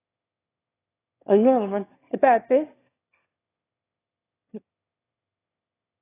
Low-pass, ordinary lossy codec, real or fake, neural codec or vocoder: 3.6 kHz; AAC, 16 kbps; fake; autoencoder, 22.05 kHz, a latent of 192 numbers a frame, VITS, trained on one speaker